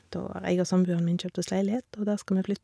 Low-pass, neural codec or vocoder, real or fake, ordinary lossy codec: none; none; real; none